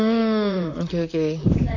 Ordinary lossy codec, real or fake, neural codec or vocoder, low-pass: none; fake; vocoder, 44.1 kHz, 128 mel bands, Pupu-Vocoder; 7.2 kHz